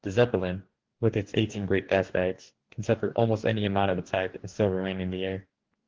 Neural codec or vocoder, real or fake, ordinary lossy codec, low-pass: codec, 44.1 kHz, 2.6 kbps, DAC; fake; Opus, 16 kbps; 7.2 kHz